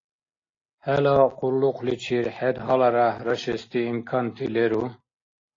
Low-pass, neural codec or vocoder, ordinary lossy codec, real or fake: 7.2 kHz; none; AAC, 32 kbps; real